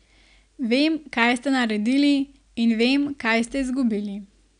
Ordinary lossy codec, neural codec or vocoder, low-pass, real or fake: none; none; 9.9 kHz; real